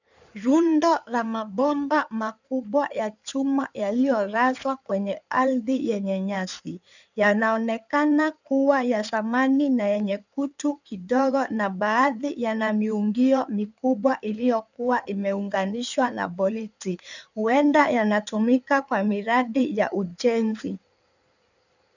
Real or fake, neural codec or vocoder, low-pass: fake; codec, 16 kHz in and 24 kHz out, 2.2 kbps, FireRedTTS-2 codec; 7.2 kHz